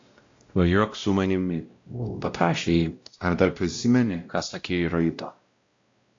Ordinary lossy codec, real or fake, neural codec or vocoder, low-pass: AAC, 48 kbps; fake; codec, 16 kHz, 0.5 kbps, X-Codec, WavLM features, trained on Multilingual LibriSpeech; 7.2 kHz